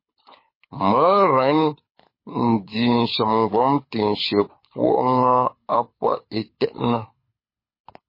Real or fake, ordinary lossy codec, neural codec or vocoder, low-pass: fake; MP3, 24 kbps; codec, 24 kHz, 6 kbps, HILCodec; 5.4 kHz